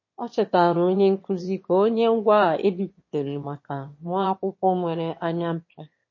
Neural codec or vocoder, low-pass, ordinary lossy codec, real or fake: autoencoder, 22.05 kHz, a latent of 192 numbers a frame, VITS, trained on one speaker; 7.2 kHz; MP3, 32 kbps; fake